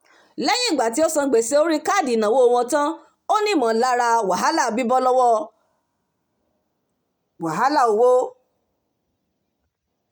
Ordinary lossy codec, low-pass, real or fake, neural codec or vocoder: none; none; real; none